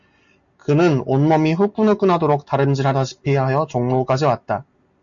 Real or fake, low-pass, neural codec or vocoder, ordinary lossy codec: real; 7.2 kHz; none; MP3, 96 kbps